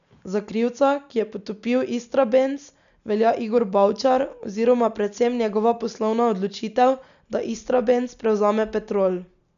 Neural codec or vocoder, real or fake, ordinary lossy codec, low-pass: none; real; none; 7.2 kHz